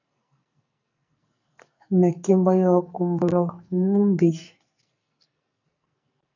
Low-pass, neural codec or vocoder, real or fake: 7.2 kHz; codec, 44.1 kHz, 2.6 kbps, SNAC; fake